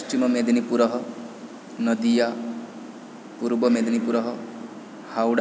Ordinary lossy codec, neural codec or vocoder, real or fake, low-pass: none; none; real; none